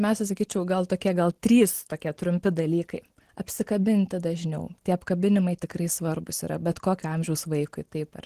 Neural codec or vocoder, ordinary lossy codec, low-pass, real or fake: none; Opus, 16 kbps; 14.4 kHz; real